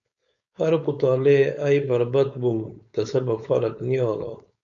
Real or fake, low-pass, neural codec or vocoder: fake; 7.2 kHz; codec, 16 kHz, 4.8 kbps, FACodec